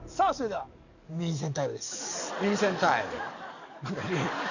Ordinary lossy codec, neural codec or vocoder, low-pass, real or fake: none; codec, 44.1 kHz, 7.8 kbps, DAC; 7.2 kHz; fake